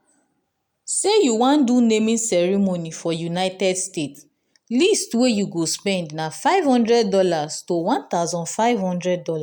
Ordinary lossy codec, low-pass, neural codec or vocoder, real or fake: none; none; none; real